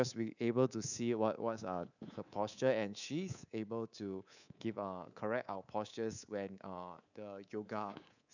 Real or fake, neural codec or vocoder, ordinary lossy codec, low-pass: fake; codec, 24 kHz, 3.1 kbps, DualCodec; none; 7.2 kHz